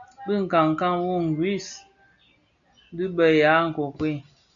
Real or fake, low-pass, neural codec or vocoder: real; 7.2 kHz; none